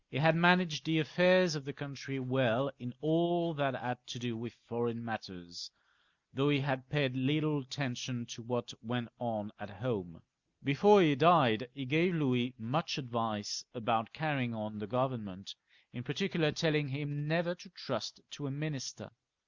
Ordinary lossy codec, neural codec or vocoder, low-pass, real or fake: Opus, 64 kbps; none; 7.2 kHz; real